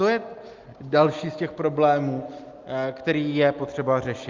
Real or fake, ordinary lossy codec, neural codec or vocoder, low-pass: real; Opus, 32 kbps; none; 7.2 kHz